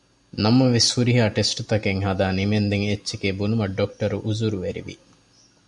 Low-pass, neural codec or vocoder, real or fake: 10.8 kHz; none; real